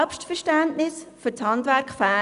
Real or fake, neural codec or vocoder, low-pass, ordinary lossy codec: real; none; 10.8 kHz; none